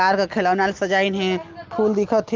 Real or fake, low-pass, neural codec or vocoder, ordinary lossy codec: real; 7.2 kHz; none; Opus, 32 kbps